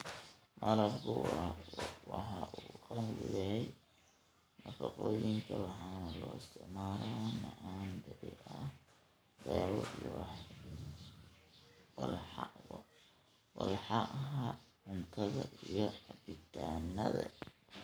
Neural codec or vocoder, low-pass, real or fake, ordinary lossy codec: codec, 44.1 kHz, 7.8 kbps, Pupu-Codec; none; fake; none